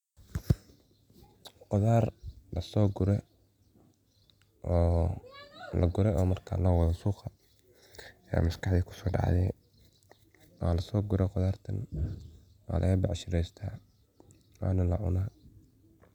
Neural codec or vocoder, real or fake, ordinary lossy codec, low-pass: none; real; none; 19.8 kHz